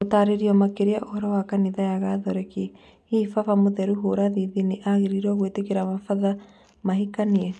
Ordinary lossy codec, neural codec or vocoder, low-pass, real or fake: none; none; none; real